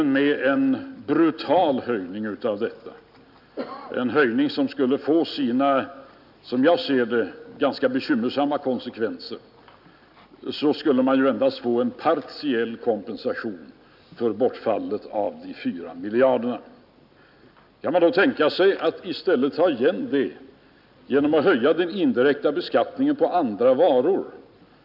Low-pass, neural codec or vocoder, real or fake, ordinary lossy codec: 5.4 kHz; none; real; none